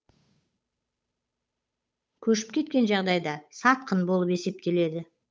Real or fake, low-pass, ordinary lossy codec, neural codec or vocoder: fake; none; none; codec, 16 kHz, 8 kbps, FunCodec, trained on Chinese and English, 25 frames a second